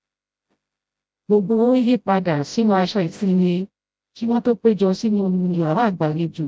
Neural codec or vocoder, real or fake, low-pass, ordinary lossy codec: codec, 16 kHz, 0.5 kbps, FreqCodec, smaller model; fake; none; none